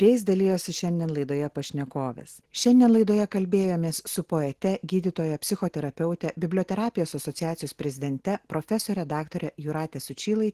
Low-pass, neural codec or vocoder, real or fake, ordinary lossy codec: 14.4 kHz; none; real; Opus, 16 kbps